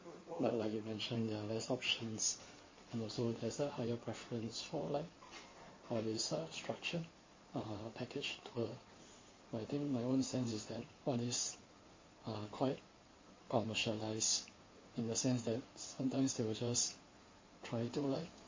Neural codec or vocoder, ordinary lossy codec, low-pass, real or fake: codec, 16 kHz in and 24 kHz out, 2.2 kbps, FireRedTTS-2 codec; MP3, 32 kbps; 7.2 kHz; fake